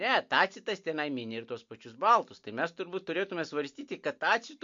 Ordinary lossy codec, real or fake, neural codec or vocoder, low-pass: MP3, 48 kbps; real; none; 7.2 kHz